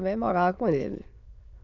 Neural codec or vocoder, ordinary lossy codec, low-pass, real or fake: autoencoder, 22.05 kHz, a latent of 192 numbers a frame, VITS, trained on many speakers; none; 7.2 kHz; fake